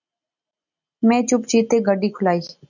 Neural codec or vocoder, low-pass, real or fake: none; 7.2 kHz; real